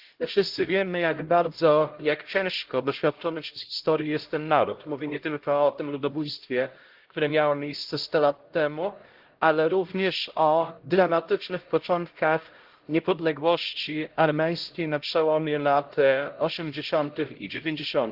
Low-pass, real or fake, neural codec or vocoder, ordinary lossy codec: 5.4 kHz; fake; codec, 16 kHz, 0.5 kbps, X-Codec, HuBERT features, trained on LibriSpeech; Opus, 16 kbps